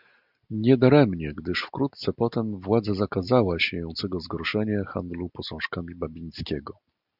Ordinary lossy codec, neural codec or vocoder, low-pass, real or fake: Opus, 64 kbps; none; 5.4 kHz; real